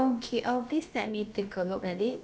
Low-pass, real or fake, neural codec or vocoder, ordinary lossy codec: none; fake; codec, 16 kHz, about 1 kbps, DyCAST, with the encoder's durations; none